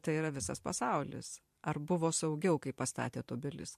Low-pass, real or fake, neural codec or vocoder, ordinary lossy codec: 14.4 kHz; fake; vocoder, 44.1 kHz, 128 mel bands every 512 samples, BigVGAN v2; MP3, 64 kbps